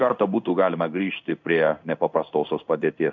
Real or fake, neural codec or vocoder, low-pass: fake; codec, 16 kHz in and 24 kHz out, 1 kbps, XY-Tokenizer; 7.2 kHz